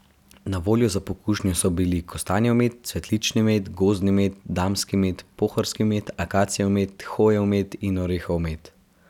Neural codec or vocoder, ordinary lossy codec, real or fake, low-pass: none; none; real; 19.8 kHz